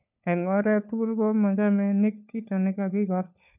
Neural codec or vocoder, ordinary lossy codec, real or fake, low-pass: codec, 16 kHz, 4 kbps, FunCodec, trained on LibriTTS, 50 frames a second; none; fake; 3.6 kHz